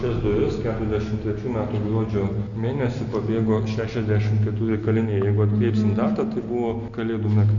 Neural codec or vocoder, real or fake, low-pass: none; real; 7.2 kHz